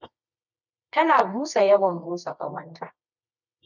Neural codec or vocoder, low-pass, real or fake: codec, 24 kHz, 0.9 kbps, WavTokenizer, medium music audio release; 7.2 kHz; fake